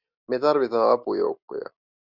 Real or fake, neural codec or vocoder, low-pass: real; none; 5.4 kHz